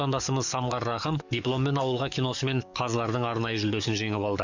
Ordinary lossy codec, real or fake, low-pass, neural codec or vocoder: none; fake; 7.2 kHz; codec, 44.1 kHz, 7.8 kbps, DAC